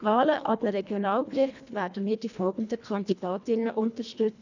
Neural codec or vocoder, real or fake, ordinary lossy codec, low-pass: codec, 24 kHz, 1.5 kbps, HILCodec; fake; none; 7.2 kHz